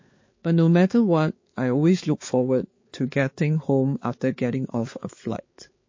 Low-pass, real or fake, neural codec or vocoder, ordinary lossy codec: 7.2 kHz; fake; codec, 16 kHz, 4 kbps, X-Codec, HuBERT features, trained on balanced general audio; MP3, 32 kbps